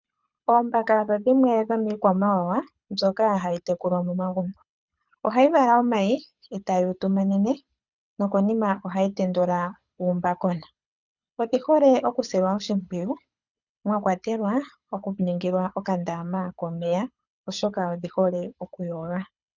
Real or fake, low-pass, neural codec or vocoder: fake; 7.2 kHz; codec, 24 kHz, 6 kbps, HILCodec